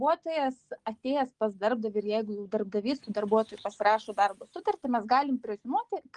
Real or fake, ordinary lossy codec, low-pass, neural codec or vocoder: real; Opus, 32 kbps; 10.8 kHz; none